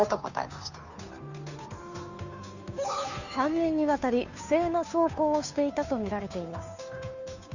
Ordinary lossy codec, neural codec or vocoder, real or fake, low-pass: none; codec, 16 kHz, 2 kbps, FunCodec, trained on Chinese and English, 25 frames a second; fake; 7.2 kHz